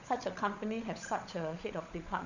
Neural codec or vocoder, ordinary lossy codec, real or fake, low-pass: codec, 16 kHz, 16 kbps, FunCodec, trained on LibriTTS, 50 frames a second; none; fake; 7.2 kHz